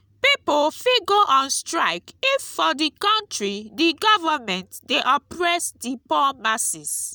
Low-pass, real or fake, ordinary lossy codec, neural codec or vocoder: none; real; none; none